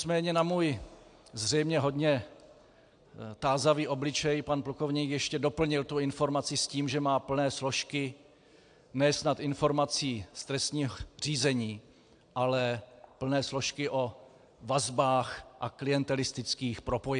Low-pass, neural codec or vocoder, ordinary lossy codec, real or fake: 9.9 kHz; none; AAC, 64 kbps; real